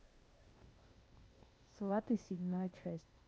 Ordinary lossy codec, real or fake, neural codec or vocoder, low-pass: none; fake; codec, 16 kHz, 0.8 kbps, ZipCodec; none